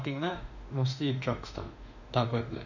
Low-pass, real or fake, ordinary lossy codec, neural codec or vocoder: 7.2 kHz; fake; none; autoencoder, 48 kHz, 32 numbers a frame, DAC-VAE, trained on Japanese speech